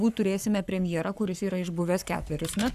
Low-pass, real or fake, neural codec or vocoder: 14.4 kHz; fake; codec, 44.1 kHz, 7.8 kbps, Pupu-Codec